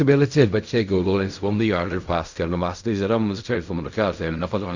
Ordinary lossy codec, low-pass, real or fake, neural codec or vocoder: Opus, 64 kbps; 7.2 kHz; fake; codec, 16 kHz in and 24 kHz out, 0.4 kbps, LongCat-Audio-Codec, fine tuned four codebook decoder